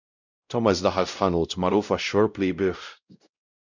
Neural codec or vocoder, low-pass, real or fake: codec, 16 kHz, 0.5 kbps, X-Codec, WavLM features, trained on Multilingual LibriSpeech; 7.2 kHz; fake